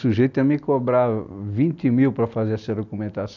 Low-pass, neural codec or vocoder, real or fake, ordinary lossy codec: 7.2 kHz; none; real; none